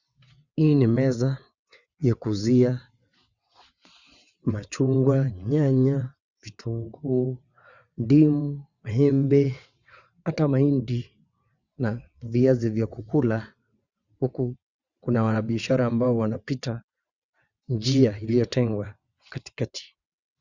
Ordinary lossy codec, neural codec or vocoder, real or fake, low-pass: Opus, 64 kbps; vocoder, 22.05 kHz, 80 mel bands, WaveNeXt; fake; 7.2 kHz